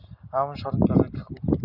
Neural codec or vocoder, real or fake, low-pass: none; real; 5.4 kHz